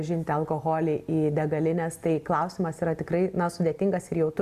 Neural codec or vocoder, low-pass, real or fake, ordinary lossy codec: none; 14.4 kHz; real; Opus, 64 kbps